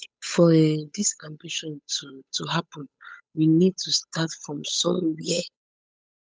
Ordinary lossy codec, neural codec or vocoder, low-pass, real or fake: none; codec, 16 kHz, 8 kbps, FunCodec, trained on Chinese and English, 25 frames a second; none; fake